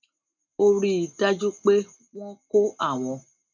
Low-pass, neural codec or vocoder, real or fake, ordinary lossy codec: 7.2 kHz; none; real; none